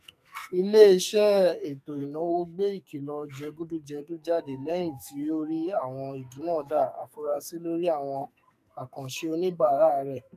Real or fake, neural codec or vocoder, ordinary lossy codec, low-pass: fake; codec, 44.1 kHz, 2.6 kbps, SNAC; none; 14.4 kHz